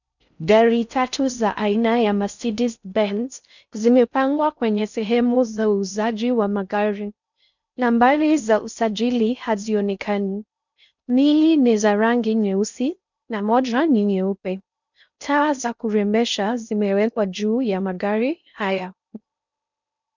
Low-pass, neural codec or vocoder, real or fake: 7.2 kHz; codec, 16 kHz in and 24 kHz out, 0.6 kbps, FocalCodec, streaming, 4096 codes; fake